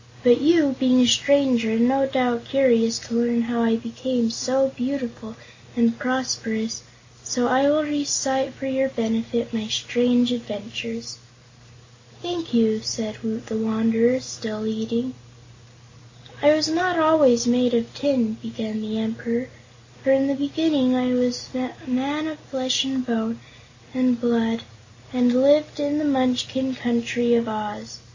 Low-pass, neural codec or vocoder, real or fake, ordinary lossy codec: 7.2 kHz; none; real; MP3, 48 kbps